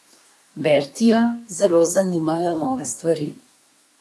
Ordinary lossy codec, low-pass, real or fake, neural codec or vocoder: none; none; fake; codec, 24 kHz, 1 kbps, SNAC